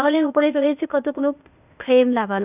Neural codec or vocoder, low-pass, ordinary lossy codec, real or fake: codec, 16 kHz, 0.8 kbps, ZipCodec; 3.6 kHz; none; fake